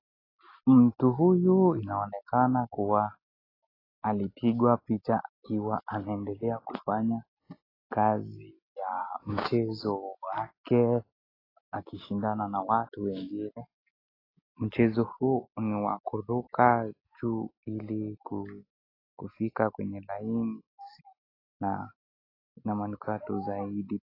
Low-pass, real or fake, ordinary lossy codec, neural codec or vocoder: 5.4 kHz; real; AAC, 24 kbps; none